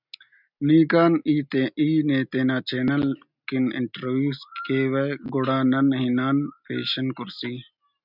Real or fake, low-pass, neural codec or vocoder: real; 5.4 kHz; none